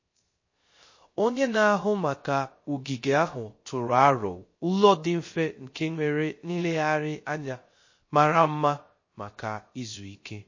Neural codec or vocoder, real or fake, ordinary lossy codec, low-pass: codec, 16 kHz, 0.3 kbps, FocalCodec; fake; MP3, 32 kbps; 7.2 kHz